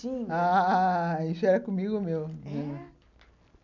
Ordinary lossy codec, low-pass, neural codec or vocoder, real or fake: none; 7.2 kHz; none; real